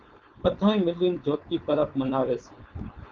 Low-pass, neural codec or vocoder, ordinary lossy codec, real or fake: 7.2 kHz; codec, 16 kHz, 4.8 kbps, FACodec; Opus, 24 kbps; fake